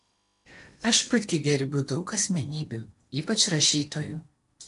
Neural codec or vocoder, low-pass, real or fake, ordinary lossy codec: codec, 16 kHz in and 24 kHz out, 0.8 kbps, FocalCodec, streaming, 65536 codes; 10.8 kHz; fake; AAC, 64 kbps